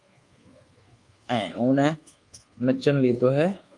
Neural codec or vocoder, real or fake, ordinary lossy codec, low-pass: codec, 24 kHz, 1.2 kbps, DualCodec; fake; Opus, 32 kbps; 10.8 kHz